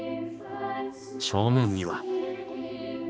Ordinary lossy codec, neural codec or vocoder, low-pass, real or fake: none; codec, 16 kHz, 2 kbps, X-Codec, HuBERT features, trained on balanced general audio; none; fake